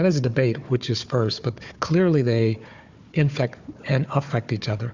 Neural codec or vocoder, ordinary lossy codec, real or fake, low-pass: codec, 16 kHz, 4 kbps, FunCodec, trained on Chinese and English, 50 frames a second; Opus, 64 kbps; fake; 7.2 kHz